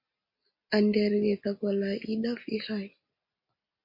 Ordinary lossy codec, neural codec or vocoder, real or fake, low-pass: MP3, 32 kbps; none; real; 5.4 kHz